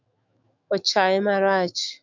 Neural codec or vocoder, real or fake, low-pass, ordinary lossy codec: autoencoder, 48 kHz, 128 numbers a frame, DAC-VAE, trained on Japanese speech; fake; 7.2 kHz; MP3, 64 kbps